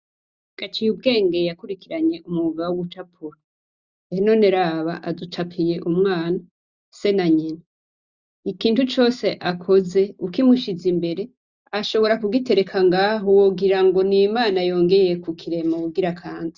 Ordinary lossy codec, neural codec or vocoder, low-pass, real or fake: Opus, 64 kbps; none; 7.2 kHz; real